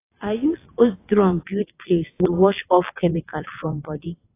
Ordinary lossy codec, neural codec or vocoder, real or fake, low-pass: AAC, 24 kbps; vocoder, 22.05 kHz, 80 mel bands, WaveNeXt; fake; 3.6 kHz